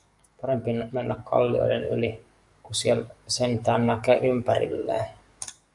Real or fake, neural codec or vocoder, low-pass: fake; codec, 44.1 kHz, 7.8 kbps, DAC; 10.8 kHz